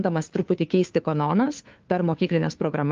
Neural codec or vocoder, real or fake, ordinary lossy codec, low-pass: codec, 16 kHz, 2 kbps, FunCodec, trained on Chinese and English, 25 frames a second; fake; Opus, 32 kbps; 7.2 kHz